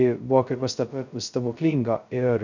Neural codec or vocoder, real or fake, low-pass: codec, 16 kHz, 0.2 kbps, FocalCodec; fake; 7.2 kHz